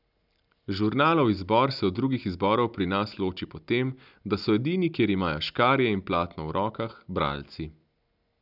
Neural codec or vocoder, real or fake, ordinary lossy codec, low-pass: none; real; none; 5.4 kHz